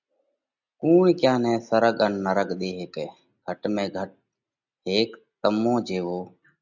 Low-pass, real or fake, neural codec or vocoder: 7.2 kHz; real; none